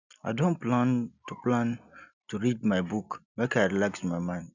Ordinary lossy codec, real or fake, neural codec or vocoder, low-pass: none; real; none; 7.2 kHz